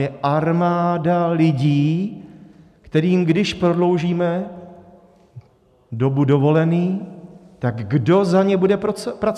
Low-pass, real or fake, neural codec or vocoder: 14.4 kHz; real; none